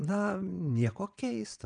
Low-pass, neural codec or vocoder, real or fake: 9.9 kHz; vocoder, 22.05 kHz, 80 mel bands, WaveNeXt; fake